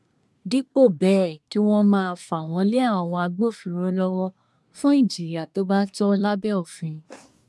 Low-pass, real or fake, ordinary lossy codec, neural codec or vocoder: none; fake; none; codec, 24 kHz, 1 kbps, SNAC